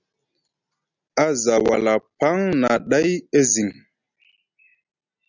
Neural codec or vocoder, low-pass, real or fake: none; 7.2 kHz; real